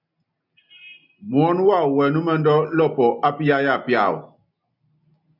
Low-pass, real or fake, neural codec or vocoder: 5.4 kHz; real; none